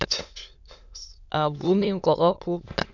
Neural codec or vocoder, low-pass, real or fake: autoencoder, 22.05 kHz, a latent of 192 numbers a frame, VITS, trained on many speakers; 7.2 kHz; fake